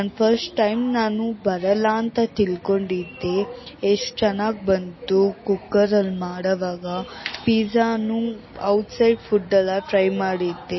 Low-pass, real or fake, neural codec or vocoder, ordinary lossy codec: 7.2 kHz; real; none; MP3, 24 kbps